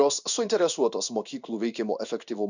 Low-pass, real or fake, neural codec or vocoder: 7.2 kHz; fake; codec, 16 kHz in and 24 kHz out, 1 kbps, XY-Tokenizer